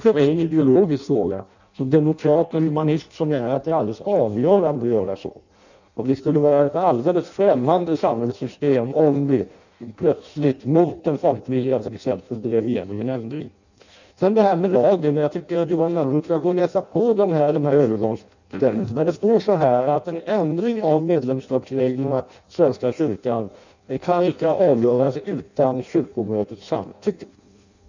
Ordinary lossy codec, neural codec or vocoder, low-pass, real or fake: none; codec, 16 kHz in and 24 kHz out, 0.6 kbps, FireRedTTS-2 codec; 7.2 kHz; fake